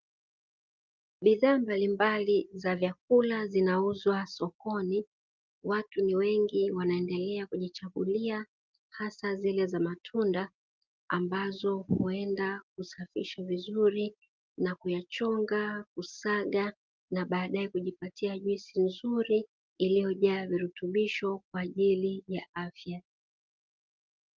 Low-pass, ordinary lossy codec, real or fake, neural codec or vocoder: 7.2 kHz; Opus, 32 kbps; real; none